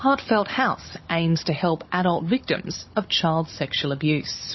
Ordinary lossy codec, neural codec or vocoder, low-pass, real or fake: MP3, 24 kbps; codec, 16 kHz, 16 kbps, FunCodec, trained on Chinese and English, 50 frames a second; 7.2 kHz; fake